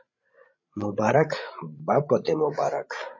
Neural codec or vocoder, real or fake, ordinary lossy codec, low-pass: codec, 16 kHz, 16 kbps, FreqCodec, larger model; fake; MP3, 32 kbps; 7.2 kHz